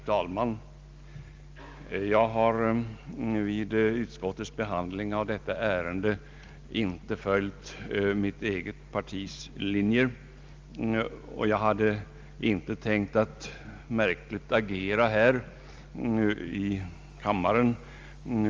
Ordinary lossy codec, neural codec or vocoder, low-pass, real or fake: Opus, 32 kbps; none; 7.2 kHz; real